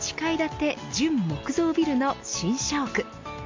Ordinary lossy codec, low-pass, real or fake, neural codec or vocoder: MP3, 48 kbps; 7.2 kHz; real; none